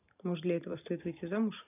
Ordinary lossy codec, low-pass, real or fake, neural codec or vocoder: none; 3.6 kHz; fake; vocoder, 44.1 kHz, 128 mel bands every 512 samples, BigVGAN v2